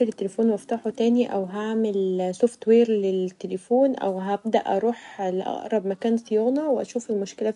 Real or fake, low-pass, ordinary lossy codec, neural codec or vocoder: real; 10.8 kHz; none; none